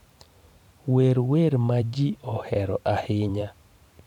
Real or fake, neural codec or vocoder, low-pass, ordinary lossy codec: fake; vocoder, 44.1 kHz, 128 mel bands every 512 samples, BigVGAN v2; 19.8 kHz; none